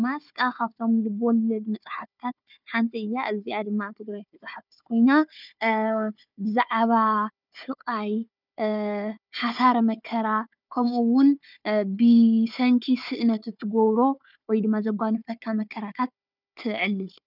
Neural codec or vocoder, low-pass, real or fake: codec, 16 kHz, 4 kbps, FunCodec, trained on Chinese and English, 50 frames a second; 5.4 kHz; fake